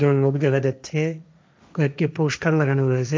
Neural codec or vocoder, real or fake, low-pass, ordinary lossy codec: codec, 16 kHz, 1.1 kbps, Voila-Tokenizer; fake; none; none